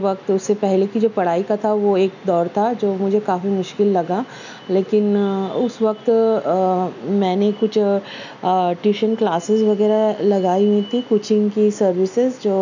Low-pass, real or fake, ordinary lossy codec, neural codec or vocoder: 7.2 kHz; real; none; none